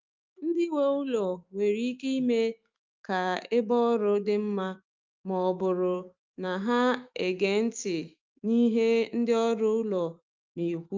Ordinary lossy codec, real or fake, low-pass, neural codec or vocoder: Opus, 24 kbps; fake; 7.2 kHz; autoencoder, 48 kHz, 128 numbers a frame, DAC-VAE, trained on Japanese speech